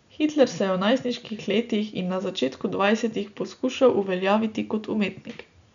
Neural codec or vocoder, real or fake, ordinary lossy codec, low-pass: none; real; none; 7.2 kHz